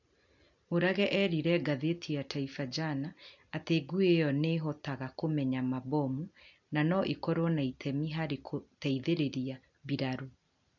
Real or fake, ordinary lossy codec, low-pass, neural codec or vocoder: real; none; 7.2 kHz; none